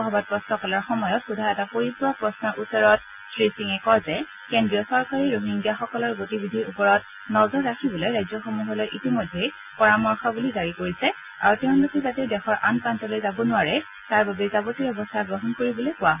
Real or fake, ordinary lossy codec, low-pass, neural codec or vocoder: real; none; 3.6 kHz; none